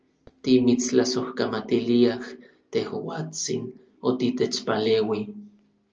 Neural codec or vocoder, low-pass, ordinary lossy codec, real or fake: none; 7.2 kHz; Opus, 24 kbps; real